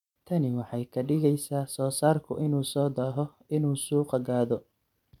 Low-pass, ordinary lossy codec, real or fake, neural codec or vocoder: 19.8 kHz; none; real; none